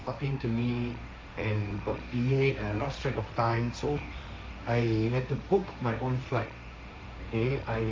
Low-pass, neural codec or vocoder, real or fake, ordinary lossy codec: none; codec, 16 kHz, 1.1 kbps, Voila-Tokenizer; fake; none